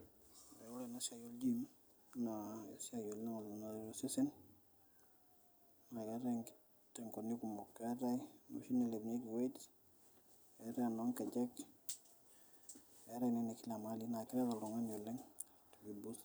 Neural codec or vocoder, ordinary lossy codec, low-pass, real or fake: none; none; none; real